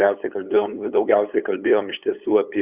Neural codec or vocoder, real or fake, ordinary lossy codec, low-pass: codec, 16 kHz, 8 kbps, FunCodec, trained on LibriTTS, 25 frames a second; fake; Opus, 64 kbps; 3.6 kHz